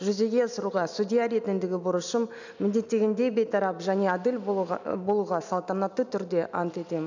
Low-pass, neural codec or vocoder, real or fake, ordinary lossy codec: 7.2 kHz; none; real; none